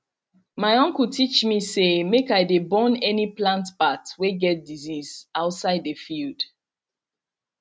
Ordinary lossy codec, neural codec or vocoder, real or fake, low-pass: none; none; real; none